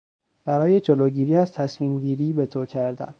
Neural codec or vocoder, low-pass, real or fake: codec, 24 kHz, 0.9 kbps, WavTokenizer, medium speech release version 1; 9.9 kHz; fake